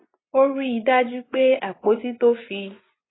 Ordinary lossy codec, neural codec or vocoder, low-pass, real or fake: AAC, 16 kbps; vocoder, 24 kHz, 100 mel bands, Vocos; 7.2 kHz; fake